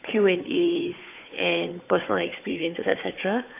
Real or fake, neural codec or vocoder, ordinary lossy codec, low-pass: fake; codec, 16 kHz, 4 kbps, FunCodec, trained on Chinese and English, 50 frames a second; AAC, 24 kbps; 3.6 kHz